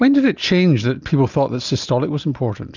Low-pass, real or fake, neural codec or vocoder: 7.2 kHz; real; none